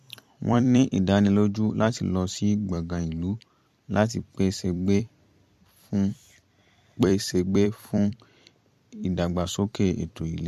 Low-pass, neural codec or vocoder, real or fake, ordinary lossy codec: 14.4 kHz; vocoder, 44.1 kHz, 128 mel bands every 256 samples, BigVGAN v2; fake; MP3, 64 kbps